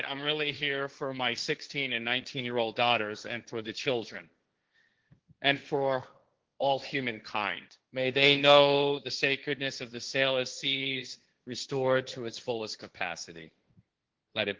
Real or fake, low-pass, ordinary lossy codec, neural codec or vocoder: fake; 7.2 kHz; Opus, 16 kbps; codec, 16 kHz, 1.1 kbps, Voila-Tokenizer